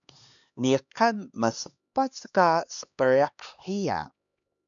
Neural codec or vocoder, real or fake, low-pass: codec, 16 kHz, 2 kbps, X-Codec, HuBERT features, trained on LibriSpeech; fake; 7.2 kHz